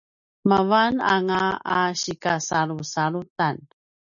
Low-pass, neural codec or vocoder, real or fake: 7.2 kHz; none; real